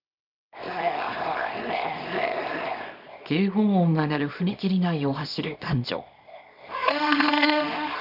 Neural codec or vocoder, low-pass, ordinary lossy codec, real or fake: codec, 24 kHz, 0.9 kbps, WavTokenizer, small release; 5.4 kHz; none; fake